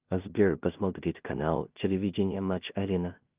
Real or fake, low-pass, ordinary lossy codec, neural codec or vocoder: fake; 3.6 kHz; Opus, 24 kbps; codec, 16 kHz in and 24 kHz out, 0.4 kbps, LongCat-Audio-Codec, two codebook decoder